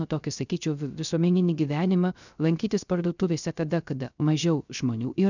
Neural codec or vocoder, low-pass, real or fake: codec, 16 kHz, 0.3 kbps, FocalCodec; 7.2 kHz; fake